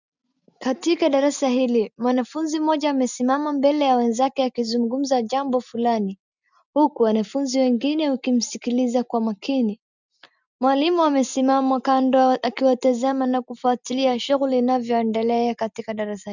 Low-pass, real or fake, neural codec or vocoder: 7.2 kHz; real; none